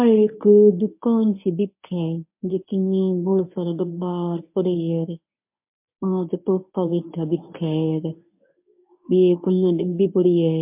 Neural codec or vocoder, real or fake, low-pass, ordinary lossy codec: codec, 24 kHz, 0.9 kbps, WavTokenizer, medium speech release version 1; fake; 3.6 kHz; MP3, 32 kbps